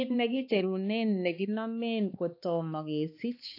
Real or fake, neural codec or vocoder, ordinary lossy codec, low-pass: fake; codec, 16 kHz, 2 kbps, X-Codec, HuBERT features, trained on balanced general audio; AAC, 32 kbps; 5.4 kHz